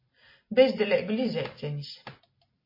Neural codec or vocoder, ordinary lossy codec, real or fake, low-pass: none; MP3, 24 kbps; real; 5.4 kHz